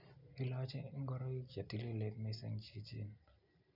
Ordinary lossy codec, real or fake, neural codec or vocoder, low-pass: none; real; none; 5.4 kHz